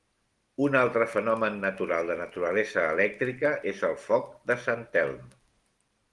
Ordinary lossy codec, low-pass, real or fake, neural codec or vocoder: Opus, 24 kbps; 10.8 kHz; real; none